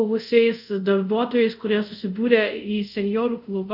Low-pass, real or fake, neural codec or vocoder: 5.4 kHz; fake; codec, 24 kHz, 0.5 kbps, DualCodec